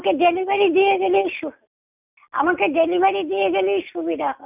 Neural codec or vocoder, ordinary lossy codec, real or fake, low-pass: none; none; real; 3.6 kHz